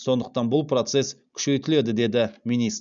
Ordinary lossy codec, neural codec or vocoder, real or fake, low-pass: none; none; real; 7.2 kHz